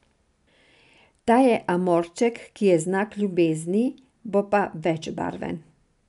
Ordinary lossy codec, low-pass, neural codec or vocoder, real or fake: none; 10.8 kHz; none; real